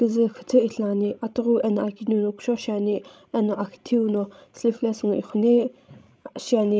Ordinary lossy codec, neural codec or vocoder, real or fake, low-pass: none; codec, 16 kHz, 16 kbps, FreqCodec, larger model; fake; none